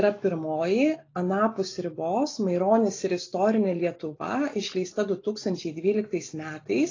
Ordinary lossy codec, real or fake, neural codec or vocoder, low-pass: AAC, 32 kbps; real; none; 7.2 kHz